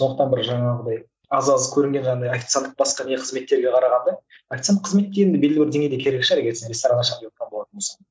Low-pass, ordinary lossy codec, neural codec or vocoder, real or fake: none; none; none; real